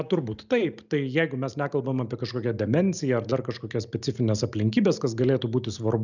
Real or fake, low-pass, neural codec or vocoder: real; 7.2 kHz; none